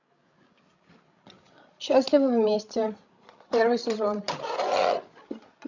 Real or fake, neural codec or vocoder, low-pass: fake; codec, 16 kHz, 16 kbps, FreqCodec, larger model; 7.2 kHz